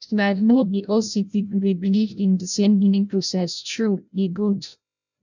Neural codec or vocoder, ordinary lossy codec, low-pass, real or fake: codec, 16 kHz, 0.5 kbps, FreqCodec, larger model; none; 7.2 kHz; fake